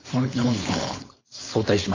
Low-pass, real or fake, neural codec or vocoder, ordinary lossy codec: 7.2 kHz; fake; codec, 16 kHz, 4.8 kbps, FACodec; AAC, 32 kbps